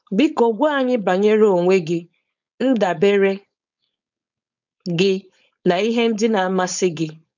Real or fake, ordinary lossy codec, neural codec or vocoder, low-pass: fake; none; codec, 16 kHz, 4.8 kbps, FACodec; 7.2 kHz